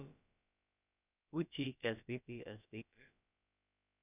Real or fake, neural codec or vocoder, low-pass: fake; codec, 16 kHz, about 1 kbps, DyCAST, with the encoder's durations; 3.6 kHz